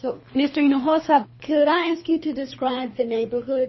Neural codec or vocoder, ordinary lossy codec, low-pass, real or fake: codec, 24 kHz, 3 kbps, HILCodec; MP3, 24 kbps; 7.2 kHz; fake